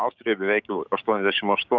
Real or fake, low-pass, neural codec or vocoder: fake; 7.2 kHz; codec, 16 kHz, 6 kbps, DAC